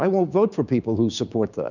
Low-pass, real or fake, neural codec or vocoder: 7.2 kHz; fake; codec, 16 kHz, 6 kbps, DAC